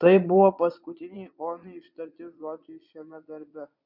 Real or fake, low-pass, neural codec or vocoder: fake; 5.4 kHz; vocoder, 22.05 kHz, 80 mel bands, Vocos